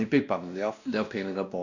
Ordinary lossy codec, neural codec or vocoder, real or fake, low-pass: none; codec, 16 kHz, 1 kbps, X-Codec, WavLM features, trained on Multilingual LibriSpeech; fake; 7.2 kHz